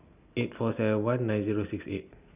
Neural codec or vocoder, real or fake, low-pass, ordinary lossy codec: none; real; 3.6 kHz; none